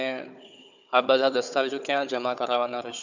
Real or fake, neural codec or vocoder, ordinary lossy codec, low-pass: fake; codec, 16 kHz, 4 kbps, FunCodec, trained on Chinese and English, 50 frames a second; none; 7.2 kHz